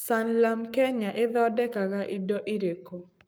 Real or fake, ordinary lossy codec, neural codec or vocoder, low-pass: fake; none; codec, 44.1 kHz, 7.8 kbps, Pupu-Codec; none